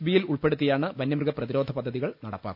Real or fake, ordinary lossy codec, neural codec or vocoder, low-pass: real; none; none; 5.4 kHz